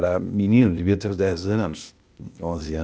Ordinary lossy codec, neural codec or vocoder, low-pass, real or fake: none; codec, 16 kHz, 0.8 kbps, ZipCodec; none; fake